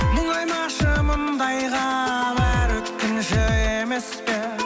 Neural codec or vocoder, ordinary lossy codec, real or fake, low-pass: none; none; real; none